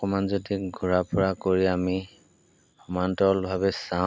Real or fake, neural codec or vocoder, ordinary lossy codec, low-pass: real; none; none; none